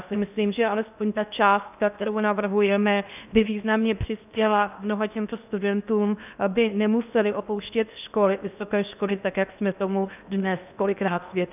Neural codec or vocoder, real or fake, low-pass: codec, 16 kHz in and 24 kHz out, 0.8 kbps, FocalCodec, streaming, 65536 codes; fake; 3.6 kHz